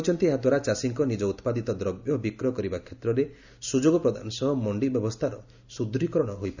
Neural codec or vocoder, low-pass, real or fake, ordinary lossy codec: none; 7.2 kHz; real; none